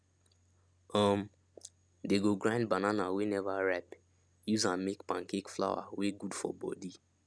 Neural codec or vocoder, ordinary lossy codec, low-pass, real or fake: none; none; none; real